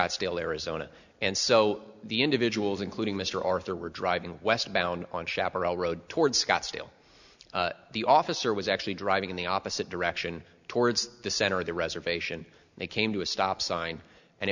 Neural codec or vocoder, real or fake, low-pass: none; real; 7.2 kHz